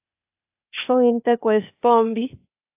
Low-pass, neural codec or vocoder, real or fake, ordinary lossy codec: 3.6 kHz; codec, 16 kHz, 0.8 kbps, ZipCodec; fake; AAC, 32 kbps